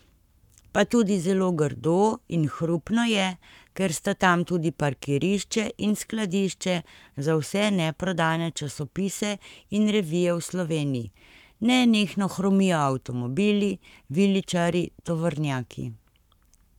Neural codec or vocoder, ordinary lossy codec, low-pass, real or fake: codec, 44.1 kHz, 7.8 kbps, Pupu-Codec; none; 19.8 kHz; fake